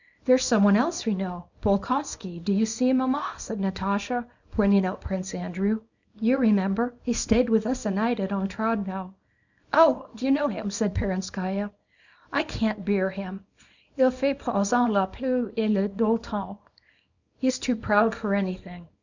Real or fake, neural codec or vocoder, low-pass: fake; codec, 24 kHz, 0.9 kbps, WavTokenizer, small release; 7.2 kHz